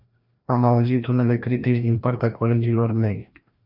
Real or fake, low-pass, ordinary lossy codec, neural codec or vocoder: fake; 5.4 kHz; AAC, 48 kbps; codec, 16 kHz, 1 kbps, FreqCodec, larger model